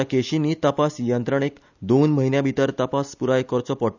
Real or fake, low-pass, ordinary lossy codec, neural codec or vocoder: real; 7.2 kHz; none; none